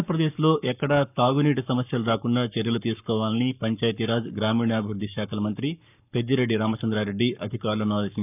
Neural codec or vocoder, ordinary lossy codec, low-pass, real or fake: codec, 44.1 kHz, 7.8 kbps, Pupu-Codec; none; 3.6 kHz; fake